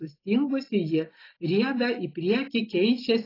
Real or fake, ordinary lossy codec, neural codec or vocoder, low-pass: real; AAC, 24 kbps; none; 5.4 kHz